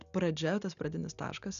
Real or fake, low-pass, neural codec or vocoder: real; 7.2 kHz; none